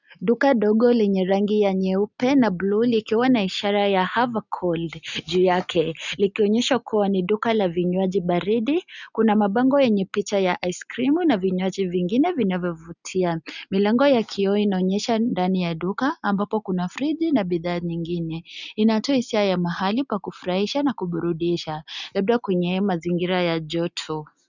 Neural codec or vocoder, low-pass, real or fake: none; 7.2 kHz; real